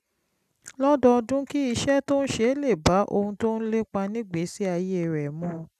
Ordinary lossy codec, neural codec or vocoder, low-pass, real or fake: none; none; 14.4 kHz; real